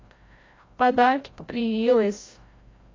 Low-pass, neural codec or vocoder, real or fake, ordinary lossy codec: 7.2 kHz; codec, 16 kHz, 0.5 kbps, FreqCodec, larger model; fake; AAC, 32 kbps